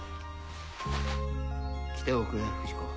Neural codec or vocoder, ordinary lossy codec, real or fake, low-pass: none; none; real; none